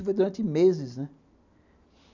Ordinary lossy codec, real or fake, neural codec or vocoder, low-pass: none; real; none; 7.2 kHz